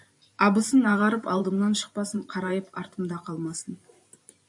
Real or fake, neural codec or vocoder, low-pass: fake; vocoder, 44.1 kHz, 128 mel bands every 512 samples, BigVGAN v2; 10.8 kHz